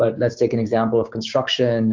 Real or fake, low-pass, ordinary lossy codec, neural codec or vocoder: fake; 7.2 kHz; MP3, 64 kbps; codec, 16 kHz, 6 kbps, DAC